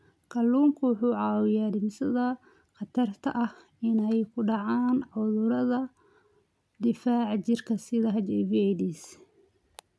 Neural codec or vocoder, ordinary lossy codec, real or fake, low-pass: none; none; real; none